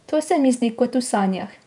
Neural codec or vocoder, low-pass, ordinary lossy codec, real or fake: vocoder, 48 kHz, 128 mel bands, Vocos; 10.8 kHz; none; fake